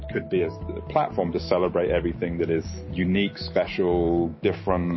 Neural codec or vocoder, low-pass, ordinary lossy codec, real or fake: none; 7.2 kHz; MP3, 24 kbps; real